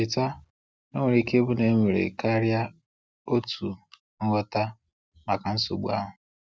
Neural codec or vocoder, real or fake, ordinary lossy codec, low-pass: none; real; none; none